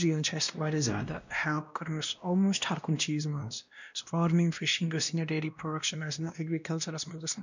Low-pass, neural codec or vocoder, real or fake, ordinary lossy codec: 7.2 kHz; codec, 16 kHz, 1 kbps, X-Codec, WavLM features, trained on Multilingual LibriSpeech; fake; none